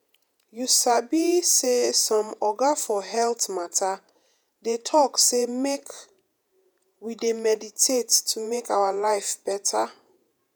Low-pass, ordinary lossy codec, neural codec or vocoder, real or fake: none; none; vocoder, 48 kHz, 128 mel bands, Vocos; fake